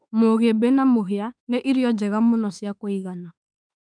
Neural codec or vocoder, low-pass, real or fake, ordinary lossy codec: autoencoder, 48 kHz, 32 numbers a frame, DAC-VAE, trained on Japanese speech; 9.9 kHz; fake; none